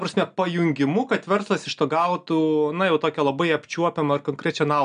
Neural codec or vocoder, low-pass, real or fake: none; 9.9 kHz; real